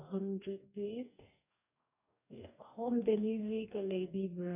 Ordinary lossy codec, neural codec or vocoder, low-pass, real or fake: none; codec, 44.1 kHz, 2.6 kbps, DAC; 3.6 kHz; fake